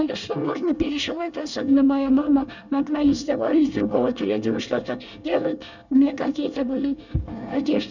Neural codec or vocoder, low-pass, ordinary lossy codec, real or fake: codec, 24 kHz, 1 kbps, SNAC; 7.2 kHz; none; fake